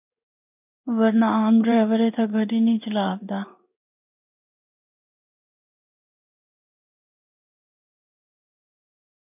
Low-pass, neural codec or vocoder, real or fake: 3.6 kHz; codec, 16 kHz in and 24 kHz out, 1 kbps, XY-Tokenizer; fake